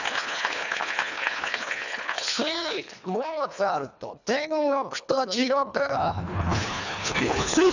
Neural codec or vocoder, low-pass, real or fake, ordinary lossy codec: codec, 24 kHz, 1.5 kbps, HILCodec; 7.2 kHz; fake; none